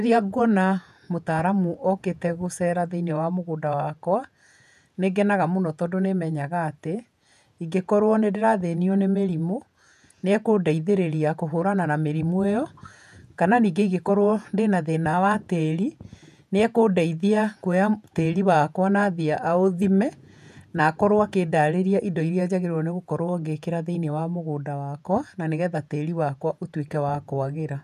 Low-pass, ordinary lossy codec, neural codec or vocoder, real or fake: 14.4 kHz; none; vocoder, 48 kHz, 128 mel bands, Vocos; fake